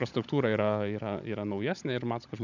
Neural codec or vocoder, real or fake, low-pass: codec, 16 kHz, 16 kbps, FunCodec, trained on Chinese and English, 50 frames a second; fake; 7.2 kHz